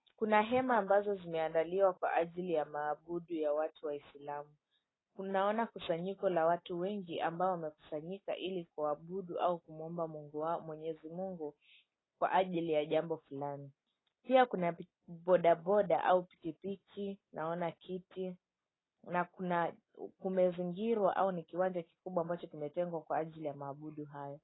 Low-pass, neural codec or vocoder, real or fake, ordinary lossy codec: 7.2 kHz; none; real; AAC, 16 kbps